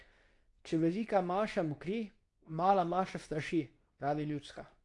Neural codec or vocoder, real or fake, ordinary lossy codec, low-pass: codec, 24 kHz, 0.9 kbps, WavTokenizer, medium speech release version 2; fake; AAC, 48 kbps; 10.8 kHz